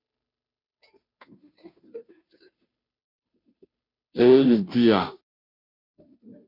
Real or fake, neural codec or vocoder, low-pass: fake; codec, 16 kHz, 0.5 kbps, FunCodec, trained on Chinese and English, 25 frames a second; 5.4 kHz